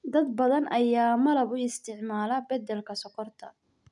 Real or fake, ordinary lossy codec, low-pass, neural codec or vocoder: real; none; 10.8 kHz; none